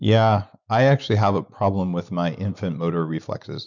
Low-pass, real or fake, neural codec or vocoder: 7.2 kHz; real; none